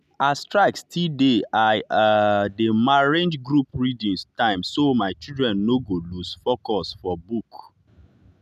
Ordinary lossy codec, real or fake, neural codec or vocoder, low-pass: none; real; none; 14.4 kHz